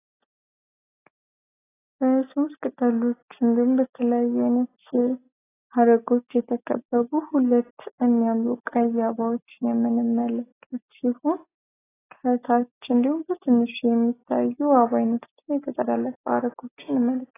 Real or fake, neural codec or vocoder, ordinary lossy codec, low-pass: real; none; AAC, 16 kbps; 3.6 kHz